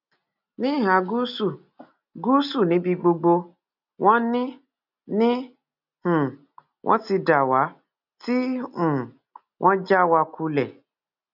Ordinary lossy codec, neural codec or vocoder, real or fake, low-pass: none; none; real; 5.4 kHz